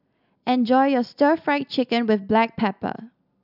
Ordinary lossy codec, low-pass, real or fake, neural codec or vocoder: none; 5.4 kHz; real; none